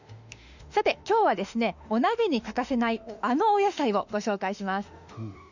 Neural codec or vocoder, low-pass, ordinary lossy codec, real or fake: autoencoder, 48 kHz, 32 numbers a frame, DAC-VAE, trained on Japanese speech; 7.2 kHz; none; fake